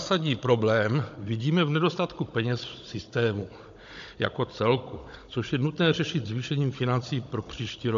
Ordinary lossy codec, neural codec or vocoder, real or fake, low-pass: AAC, 64 kbps; codec, 16 kHz, 16 kbps, FunCodec, trained on Chinese and English, 50 frames a second; fake; 7.2 kHz